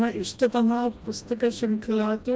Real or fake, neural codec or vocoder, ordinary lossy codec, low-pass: fake; codec, 16 kHz, 1 kbps, FreqCodec, smaller model; none; none